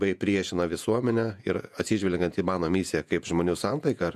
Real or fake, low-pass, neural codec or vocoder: fake; 14.4 kHz; vocoder, 48 kHz, 128 mel bands, Vocos